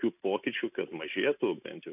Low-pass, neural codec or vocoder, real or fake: 3.6 kHz; none; real